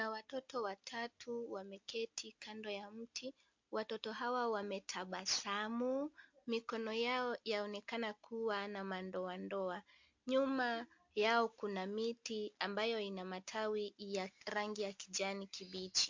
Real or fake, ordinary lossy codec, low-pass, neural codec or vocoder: real; MP3, 48 kbps; 7.2 kHz; none